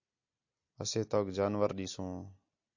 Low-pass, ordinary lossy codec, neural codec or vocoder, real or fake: 7.2 kHz; AAC, 48 kbps; none; real